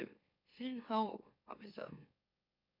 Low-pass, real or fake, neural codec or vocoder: 5.4 kHz; fake; autoencoder, 44.1 kHz, a latent of 192 numbers a frame, MeloTTS